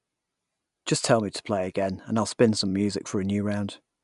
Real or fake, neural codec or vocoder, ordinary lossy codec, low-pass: real; none; none; 10.8 kHz